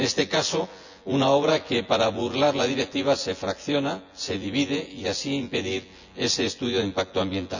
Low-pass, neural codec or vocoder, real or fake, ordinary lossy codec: 7.2 kHz; vocoder, 24 kHz, 100 mel bands, Vocos; fake; none